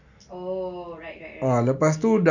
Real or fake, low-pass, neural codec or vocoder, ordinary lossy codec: real; 7.2 kHz; none; none